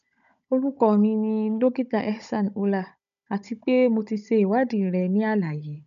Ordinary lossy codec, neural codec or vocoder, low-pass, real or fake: none; codec, 16 kHz, 16 kbps, FunCodec, trained on Chinese and English, 50 frames a second; 7.2 kHz; fake